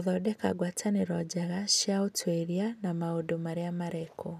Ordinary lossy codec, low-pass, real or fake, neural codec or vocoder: none; 14.4 kHz; real; none